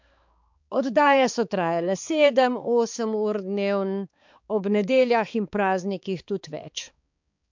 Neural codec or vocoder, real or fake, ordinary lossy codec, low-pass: codec, 16 kHz, 4 kbps, X-Codec, HuBERT features, trained on balanced general audio; fake; MP3, 64 kbps; 7.2 kHz